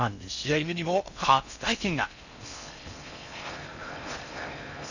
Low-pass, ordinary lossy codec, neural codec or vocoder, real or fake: 7.2 kHz; none; codec, 16 kHz in and 24 kHz out, 0.6 kbps, FocalCodec, streaming, 4096 codes; fake